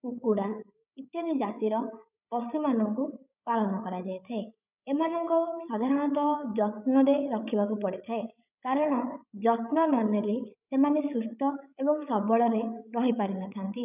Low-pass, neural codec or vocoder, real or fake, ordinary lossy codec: 3.6 kHz; codec, 16 kHz, 8 kbps, FreqCodec, larger model; fake; none